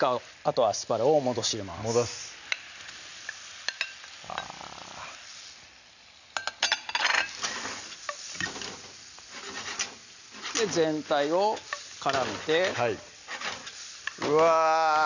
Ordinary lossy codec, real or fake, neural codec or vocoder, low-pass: none; real; none; 7.2 kHz